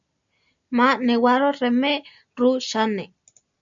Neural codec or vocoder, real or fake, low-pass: none; real; 7.2 kHz